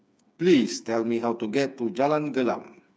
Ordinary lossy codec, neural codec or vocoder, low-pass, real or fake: none; codec, 16 kHz, 4 kbps, FreqCodec, smaller model; none; fake